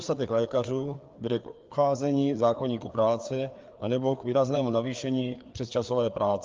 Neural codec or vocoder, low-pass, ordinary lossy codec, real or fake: codec, 16 kHz, 4 kbps, FreqCodec, larger model; 7.2 kHz; Opus, 32 kbps; fake